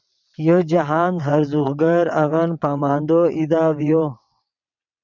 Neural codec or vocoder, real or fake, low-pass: vocoder, 22.05 kHz, 80 mel bands, WaveNeXt; fake; 7.2 kHz